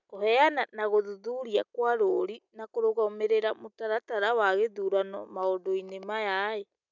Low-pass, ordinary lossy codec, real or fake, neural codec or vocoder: 7.2 kHz; none; real; none